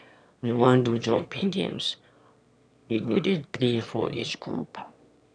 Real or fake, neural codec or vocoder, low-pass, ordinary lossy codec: fake; autoencoder, 22.05 kHz, a latent of 192 numbers a frame, VITS, trained on one speaker; 9.9 kHz; none